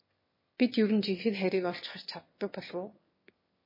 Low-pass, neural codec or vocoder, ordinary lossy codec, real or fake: 5.4 kHz; autoencoder, 22.05 kHz, a latent of 192 numbers a frame, VITS, trained on one speaker; MP3, 24 kbps; fake